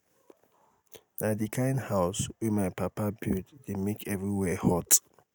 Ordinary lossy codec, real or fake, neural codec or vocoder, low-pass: none; real; none; none